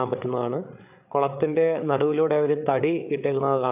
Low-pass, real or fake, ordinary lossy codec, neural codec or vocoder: 3.6 kHz; fake; AAC, 32 kbps; codec, 16 kHz, 8 kbps, FreqCodec, larger model